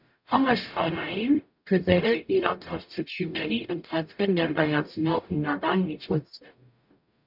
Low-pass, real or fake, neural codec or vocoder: 5.4 kHz; fake; codec, 44.1 kHz, 0.9 kbps, DAC